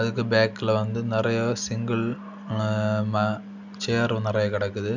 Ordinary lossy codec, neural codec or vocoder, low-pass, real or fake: none; none; 7.2 kHz; real